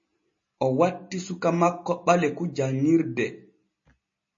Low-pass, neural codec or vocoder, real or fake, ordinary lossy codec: 7.2 kHz; none; real; MP3, 32 kbps